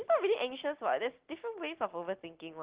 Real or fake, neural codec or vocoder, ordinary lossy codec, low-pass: real; none; Opus, 16 kbps; 3.6 kHz